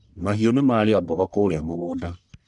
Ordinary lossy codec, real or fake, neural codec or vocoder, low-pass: none; fake; codec, 44.1 kHz, 1.7 kbps, Pupu-Codec; 10.8 kHz